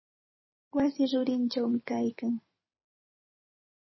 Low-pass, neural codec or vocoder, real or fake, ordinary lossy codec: 7.2 kHz; vocoder, 44.1 kHz, 128 mel bands every 256 samples, BigVGAN v2; fake; MP3, 24 kbps